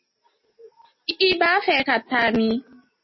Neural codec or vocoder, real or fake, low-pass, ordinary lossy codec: none; real; 7.2 kHz; MP3, 24 kbps